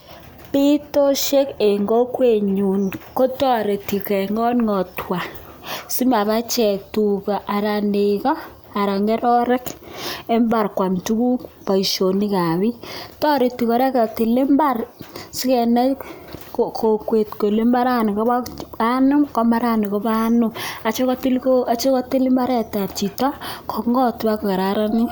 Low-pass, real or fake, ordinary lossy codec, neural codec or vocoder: none; real; none; none